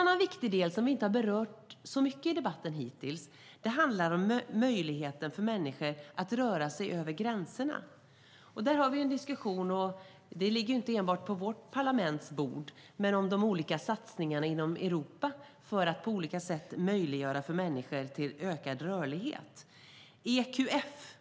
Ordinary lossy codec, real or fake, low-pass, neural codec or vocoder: none; real; none; none